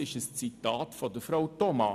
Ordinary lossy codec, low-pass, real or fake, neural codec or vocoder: none; 14.4 kHz; real; none